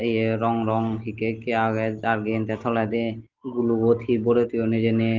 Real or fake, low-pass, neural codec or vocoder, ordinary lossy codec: real; 7.2 kHz; none; Opus, 16 kbps